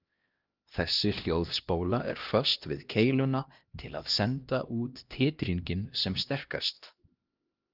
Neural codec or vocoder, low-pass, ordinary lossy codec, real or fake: codec, 16 kHz, 1 kbps, X-Codec, HuBERT features, trained on LibriSpeech; 5.4 kHz; Opus, 32 kbps; fake